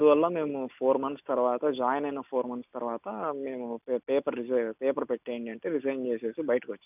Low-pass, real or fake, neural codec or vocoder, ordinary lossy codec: 3.6 kHz; real; none; none